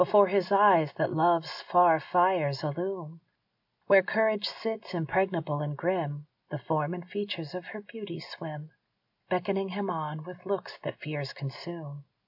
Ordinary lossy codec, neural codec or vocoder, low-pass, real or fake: MP3, 48 kbps; none; 5.4 kHz; real